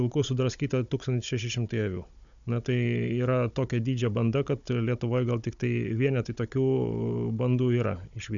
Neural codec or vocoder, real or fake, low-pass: codec, 16 kHz, 16 kbps, FunCodec, trained on Chinese and English, 50 frames a second; fake; 7.2 kHz